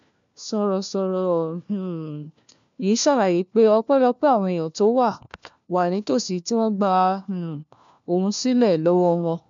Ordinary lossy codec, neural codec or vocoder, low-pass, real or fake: MP3, 64 kbps; codec, 16 kHz, 1 kbps, FunCodec, trained on LibriTTS, 50 frames a second; 7.2 kHz; fake